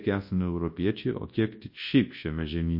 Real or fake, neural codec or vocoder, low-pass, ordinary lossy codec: fake; codec, 24 kHz, 0.9 kbps, WavTokenizer, large speech release; 5.4 kHz; MP3, 32 kbps